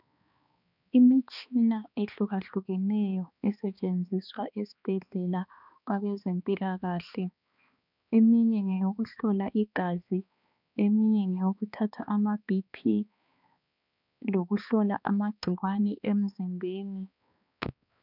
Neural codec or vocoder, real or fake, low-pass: codec, 16 kHz, 2 kbps, X-Codec, HuBERT features, trained on balanced general audio; fake; 5.4 kHz